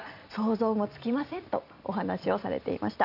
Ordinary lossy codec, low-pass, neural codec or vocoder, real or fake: none; 5.4 kHz; vocoder, 44.1 kHz, 128 mel bands every 256 samples, BigVGAN v2; fake